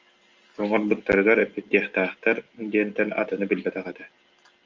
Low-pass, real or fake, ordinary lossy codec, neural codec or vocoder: 7.2 kHz; real; Opus, 32 kbps; none